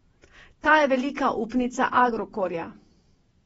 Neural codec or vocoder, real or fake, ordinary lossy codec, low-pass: vocoder, 48 kHz, 128 mel bands, Vocos; fake; AAC, 24 kbps; 19.8 kHz